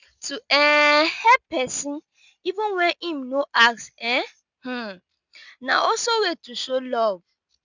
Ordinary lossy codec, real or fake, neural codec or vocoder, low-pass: none; real; none; 7.2 kHz